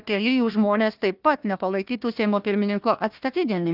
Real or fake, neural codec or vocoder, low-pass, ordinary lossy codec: fake; codec, 16 kHz, 1 kbps, FunCodec, trained on Chinese and English, 50 frames a second; 5.4 kHz; Opus, 24 kbps